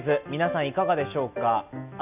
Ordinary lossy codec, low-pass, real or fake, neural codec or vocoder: none; 3.6 kHz; real; none